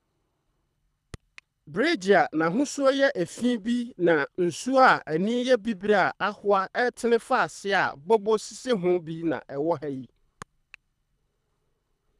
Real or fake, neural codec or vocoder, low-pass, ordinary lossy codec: fake; codec, 24 kHz, 3 kbps, HILCodec; none; none